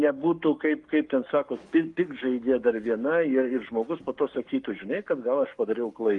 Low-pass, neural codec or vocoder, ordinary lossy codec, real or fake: 10.8 kHz; codec, 44.1 kHz, 7.8 kbps, Pupu-Codec; Opus, 32 kbps; fake